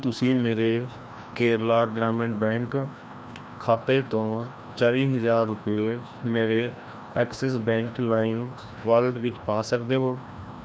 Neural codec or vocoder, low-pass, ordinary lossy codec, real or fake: codec, 16 kHz, 1 kbps, FreqCodec, larger model; none; none; fake